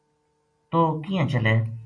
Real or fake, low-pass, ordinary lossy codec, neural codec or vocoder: real; 9.9 kHz; MP3, 48 kbps; none